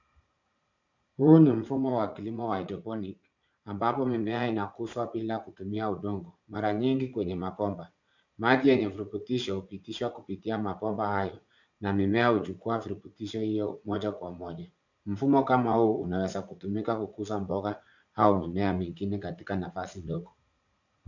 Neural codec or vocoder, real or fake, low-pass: vocoder, 44.1 kHz, 80 mel bands, Vocos; fake; 7.2 kHz